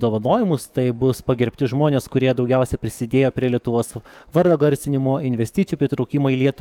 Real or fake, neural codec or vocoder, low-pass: fake; codec, 44.1 kHz, 7.8 kbps, DAC; 19.8 kHz